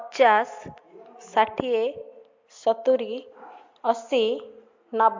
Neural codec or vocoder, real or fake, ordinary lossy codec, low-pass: none; real; MP3, 48 kbps; 7.2 kHz